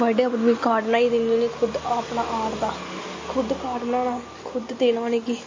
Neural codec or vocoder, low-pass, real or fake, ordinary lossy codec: none; 7.2 kHz; real; MP3, 32 kbps